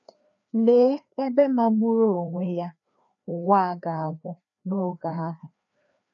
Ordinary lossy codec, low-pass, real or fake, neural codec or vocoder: none; 7.2 kHz; fake; codec, 16 kHz, 2 kbps, FreqCodec, larger model